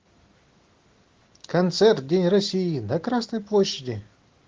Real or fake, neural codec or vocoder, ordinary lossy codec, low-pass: real; none; Opus, 16 kbps; 7.2 kHz